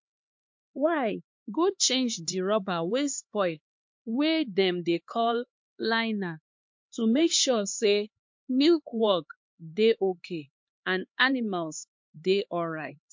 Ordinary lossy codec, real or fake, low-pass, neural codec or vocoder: MP3, 48 kbps; fake; 7.2 kHz; codec, 16 kHz, 4 kbps, X-Codec, HuBERT features, trained on LibriSpeech